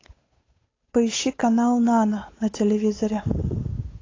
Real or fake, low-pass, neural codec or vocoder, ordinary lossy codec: fake; 7.2 kHz; codec, 16 kHz, 8 kbps, FunCodec, trained on Chinese and English, 25 frames a second; AAC, 32 kbps